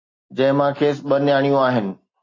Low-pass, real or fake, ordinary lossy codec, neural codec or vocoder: 7.2 kHz; real; AAC, 32 kbps; none